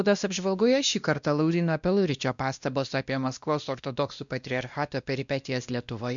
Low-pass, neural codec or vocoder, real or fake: 7.2 kHz; codec, 16 kHz, 1 kbps, X-Codec, WavLM features, trained on Multilingual LibriSpeech; fake